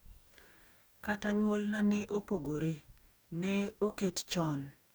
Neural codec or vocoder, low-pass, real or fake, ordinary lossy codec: codec, 44.1 kHz, 2.6 kbps, DAC; none; fake; none